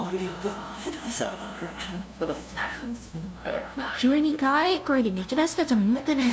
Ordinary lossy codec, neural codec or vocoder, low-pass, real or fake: none; codec, 16 kHz, 0.5 kbps, FunCodec, trained on LibriTTS, 25 frames a second; none; fake